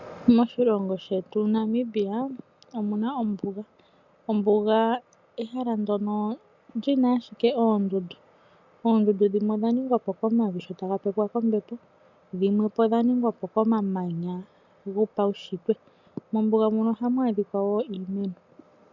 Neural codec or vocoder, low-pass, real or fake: none; 7.2 kHz; real